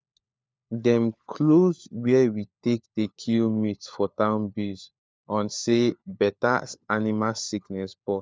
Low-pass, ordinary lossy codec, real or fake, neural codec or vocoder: none; none; fake; codec, 16 kHz, 4 kbps, FunCodec, trained on LibriTTS, 50 frames a second